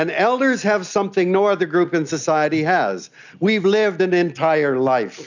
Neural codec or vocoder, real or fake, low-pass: none; real; 7.2 kHz